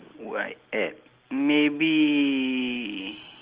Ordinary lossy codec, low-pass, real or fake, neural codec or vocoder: Opus, 16 kbps; 3.6 kHz; real; none